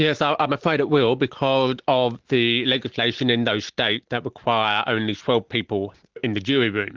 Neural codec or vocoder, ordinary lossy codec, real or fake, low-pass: none; Opus, 16 kbps; real; 7.2 kHz